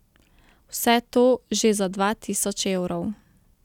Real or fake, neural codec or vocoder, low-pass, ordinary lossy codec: real; none; 19.8 kHz; none